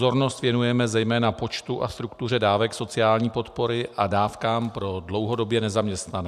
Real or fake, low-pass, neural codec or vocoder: real; 14.4 kHz; none